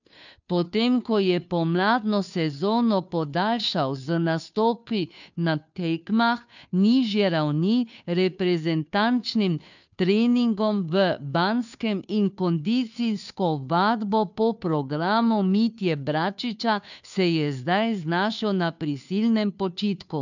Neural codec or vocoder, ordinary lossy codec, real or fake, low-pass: codec, 16 kHz, 2 kbps, FunCodec, trained on Chinese and English, 25 frames a second; none; fake; 7.2 kHz